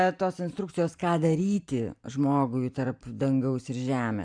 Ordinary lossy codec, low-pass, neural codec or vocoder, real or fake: MP3, 96 kbps; 9.9 kHz; vocoder, 44.1 kHz, 128 mel bands every 512 samples, BigVGAN v2; fake